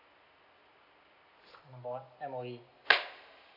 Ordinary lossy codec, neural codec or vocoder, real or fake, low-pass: none; none; real; 5.4 kHz